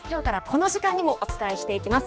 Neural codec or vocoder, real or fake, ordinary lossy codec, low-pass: codec, 16 kHz, 2 kbps, X-Codec, HuBERT features, trained on general audio; fake; none; none